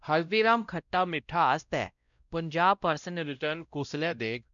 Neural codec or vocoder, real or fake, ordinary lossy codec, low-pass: codec, 16 kHz, 0.5 kbps, X-Codec, WavLM features, trained on Multilingual LibriSpeech; fake; none; 7.2 kHz